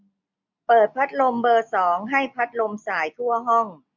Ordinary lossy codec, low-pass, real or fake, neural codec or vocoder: MP3, 64 kbps; 7.2 kHz; real; none